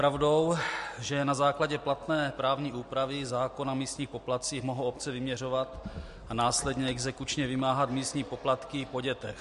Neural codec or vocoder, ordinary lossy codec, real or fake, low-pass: none; MP3, 48 kbps; real; 14.4 kHz